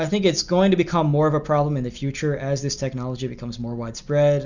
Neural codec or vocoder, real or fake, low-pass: none; real; 7.2 kHz